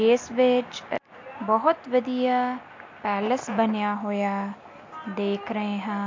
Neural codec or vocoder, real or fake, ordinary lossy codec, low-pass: none; real; MP3, 48 kbps; 7.2 kHz